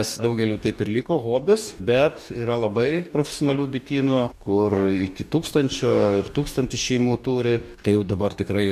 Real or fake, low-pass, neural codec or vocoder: fake; 14.4 kHz; codec, 44.1 kHz, 2.6 kbps, DAC